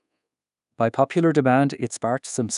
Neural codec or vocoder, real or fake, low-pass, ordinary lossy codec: codec, 24 kHz, 1.2 kbps, DualCodec; fake; 10.8 kHz; none